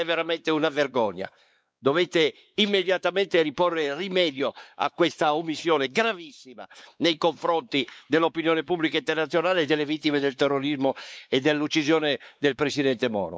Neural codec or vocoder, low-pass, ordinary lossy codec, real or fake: codec, 16 kHz, 4 kbps, X-Codec, WavLM features, trained on Multilingual LibriSpeech; none; none; fake